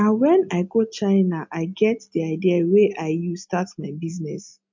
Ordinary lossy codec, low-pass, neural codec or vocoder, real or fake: MP3, 48 kbps; 7.2 kHz; none; real